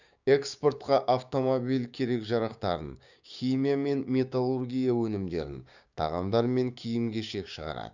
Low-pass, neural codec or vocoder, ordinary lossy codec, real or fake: 7.2 kHz; codec, 16 kHz, 6 kbps, DAC; none; fake